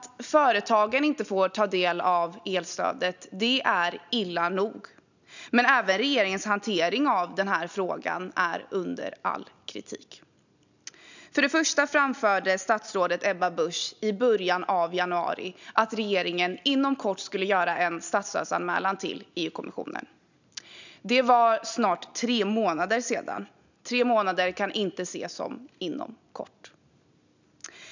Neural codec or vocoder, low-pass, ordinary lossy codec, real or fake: none; 7.2 kHz; none; real